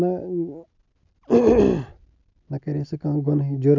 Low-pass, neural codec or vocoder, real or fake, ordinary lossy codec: 7.2 kHz; none; real; none